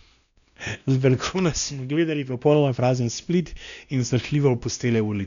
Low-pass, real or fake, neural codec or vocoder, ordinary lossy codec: 7.2 kHz; fake; codec, 16 kHz, 1 kbps, X-Codec, WavLM features, trained on Multilingual LibriSpeech; none